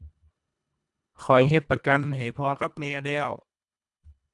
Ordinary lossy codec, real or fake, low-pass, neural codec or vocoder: none; fake; 10.8 kHz; codec, 24 kHz, 1.5 kbps, HILCodec